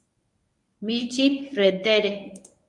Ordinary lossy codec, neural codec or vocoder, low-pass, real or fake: MP3, 64 kbps; codec, 24 kHz, 0.9 kbps, WavTokenizer, medium speech release version 1; 10.8 kHz; fake